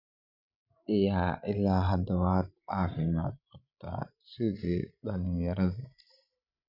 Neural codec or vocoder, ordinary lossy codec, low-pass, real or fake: codec, 16 kHz, 16 kbps, FreqCodec, larger model; none; 5.4 kHz; fake